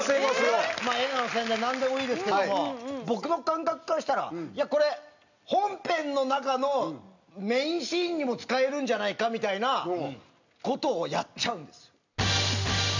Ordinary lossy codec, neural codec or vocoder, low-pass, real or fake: none; none; 7.2 kHz; real